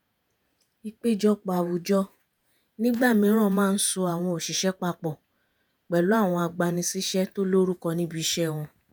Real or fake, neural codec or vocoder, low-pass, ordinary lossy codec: fake; vocoder, 48 kHz, 128 mel bands, Vocos; none; none